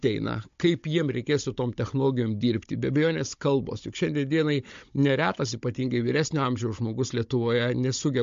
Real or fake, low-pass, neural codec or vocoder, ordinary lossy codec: fake; 7.2 kHz; codec, 16 kHz, 16 kbps, FunCodec, trained on Chinese and English, 50 frames a second; MP3, 48 kbps